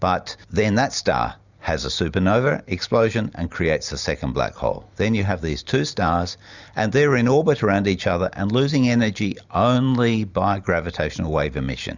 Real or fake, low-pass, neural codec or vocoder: real; 7.2 kHz; none